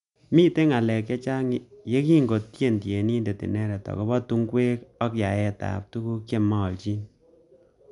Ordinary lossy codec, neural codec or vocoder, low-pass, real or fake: none; none; 10.8 kHz; real